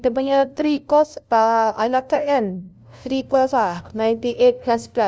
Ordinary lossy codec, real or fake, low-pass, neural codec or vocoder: none; fake; none; codec, 16 kHz, 0.5 kbps, FunCodec, trained on LibriTTS, 25 frames a second